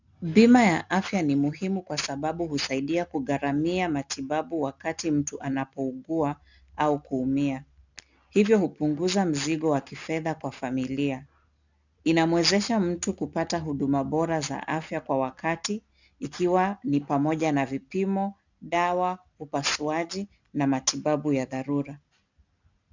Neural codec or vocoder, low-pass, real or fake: none; 7.2 kHz; real